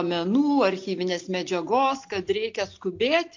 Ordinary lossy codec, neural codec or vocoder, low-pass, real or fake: MP3, 48 kbps; none; 7.2 kHz; real